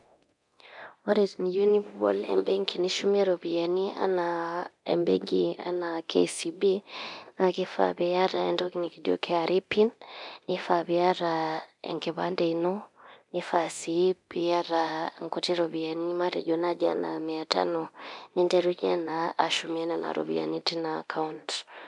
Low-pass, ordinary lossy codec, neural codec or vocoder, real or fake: 10.8 kHz; MP3, 96 kbps; codec, 24 kHz, 0.9 kbps, DualCodec; fake